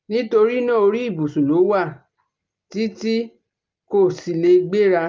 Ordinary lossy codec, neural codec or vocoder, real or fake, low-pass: Opus, 24 kbps; none; real; 7.2 kHz